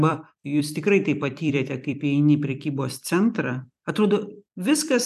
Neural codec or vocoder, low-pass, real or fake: vocoder, 44.1 kHz, 128 mel bands every 256 samples, BigVGAN v2; 14.4 kHz; fake